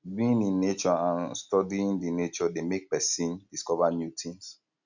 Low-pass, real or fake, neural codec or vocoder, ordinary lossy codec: 7.2 kHz; real; none; none